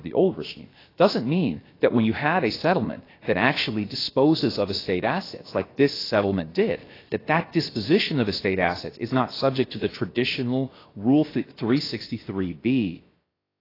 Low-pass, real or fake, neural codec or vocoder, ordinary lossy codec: 5.4 kHz; fake; codec, 16 kHz, about 1 kbps, DyCAST, with the encoder's durations; AAC, 24 kbps